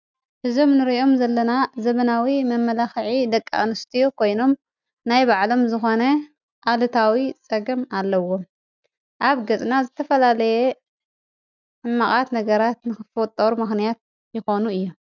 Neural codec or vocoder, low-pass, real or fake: none; 7.2 kHz; real